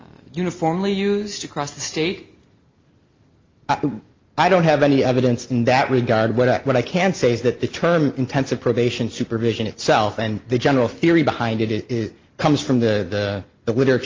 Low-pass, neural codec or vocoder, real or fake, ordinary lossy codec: 7.2 kHz; none; real; Opus, 32 kbps